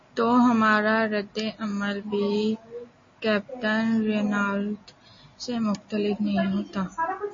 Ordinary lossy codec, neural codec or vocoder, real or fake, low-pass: MP3, 32 kbps; none; real; 7.2 kHz